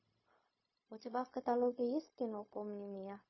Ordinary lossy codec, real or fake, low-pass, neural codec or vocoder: MP3, 24 kbps; fake; 7.2 kHz; codec, 16 kHz, 0.4 kbps, LongCat-Audio-Codec